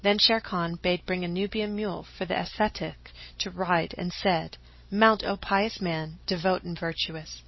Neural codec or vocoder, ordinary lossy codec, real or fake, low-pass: none; MP3, 24 kbps; real; 7.2 kHz